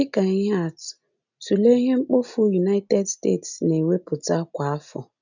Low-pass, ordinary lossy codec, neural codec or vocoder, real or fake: 7.2 kHz; none; none; real